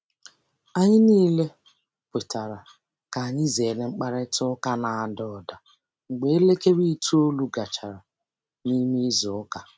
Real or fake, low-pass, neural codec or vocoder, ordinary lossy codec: real; none; none; none